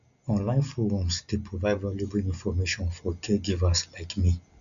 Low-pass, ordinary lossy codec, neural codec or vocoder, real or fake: 7.2 kHz; none; none; real